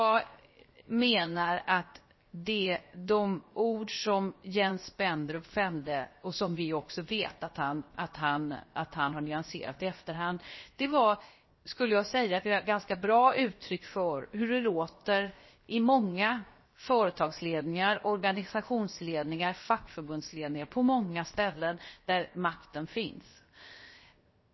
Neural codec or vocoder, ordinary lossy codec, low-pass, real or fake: codec, 16 kHz, 0.7 kbps, FocalCodec; MP3, 24 kbps; 7.2 kHz; fake